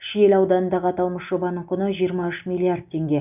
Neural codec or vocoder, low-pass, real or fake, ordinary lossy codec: none; 3.6 kHz; real; none